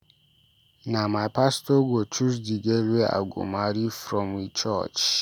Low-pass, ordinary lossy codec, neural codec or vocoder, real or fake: none; none; none; real